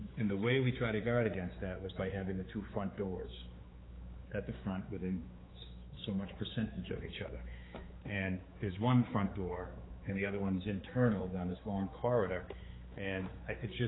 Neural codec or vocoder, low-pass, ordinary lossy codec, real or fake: codec, 16 kHz, 4 kbps, X-Codec, HuBERT features, trained on general audio; 7.2 kHz; AAC, 16 kbps; fake